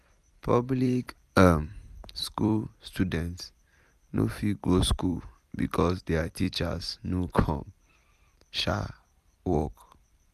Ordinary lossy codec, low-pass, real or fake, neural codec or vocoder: none; 14.4 kHz; fake; vocoder, 44.1 kHz, 128 mel bands every 256 samples, BigVGAN v2